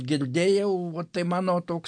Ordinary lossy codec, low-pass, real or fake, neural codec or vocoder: MP3, 64 kbps; 9.9 kHz; real; none